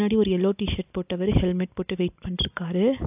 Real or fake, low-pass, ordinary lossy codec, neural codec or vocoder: real; 3.6 kHz; AAC, 32 kbps; none